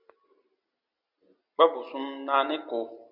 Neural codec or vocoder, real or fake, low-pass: none; real; 5.4 kHz